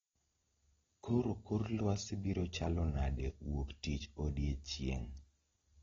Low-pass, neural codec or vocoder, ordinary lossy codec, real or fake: 7.2 kHz; none; AAC, 24 kbps; real